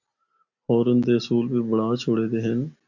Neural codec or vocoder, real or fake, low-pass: none; real; 7.2 kHz